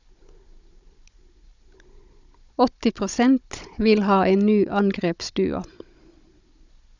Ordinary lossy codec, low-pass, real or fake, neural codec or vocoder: Opus, 64 kbps; 7.2 kHz; fake; codec, 16 kHz, 16 kbps, FunCodec, trained on Chinese and English, 50 frames a second